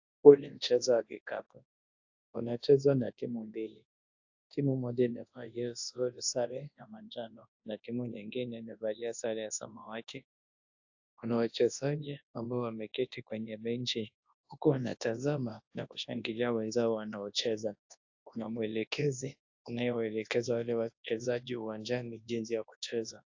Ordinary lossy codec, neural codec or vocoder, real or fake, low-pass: AAC, 48 kbps; codec, 24 kHz, 0.9 kbps, WavTokenizer, large speech release; fake; 7.2 kHz